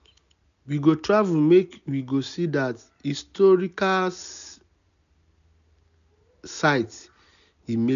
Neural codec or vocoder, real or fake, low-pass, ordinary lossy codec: none; real; 7.2 kHz; none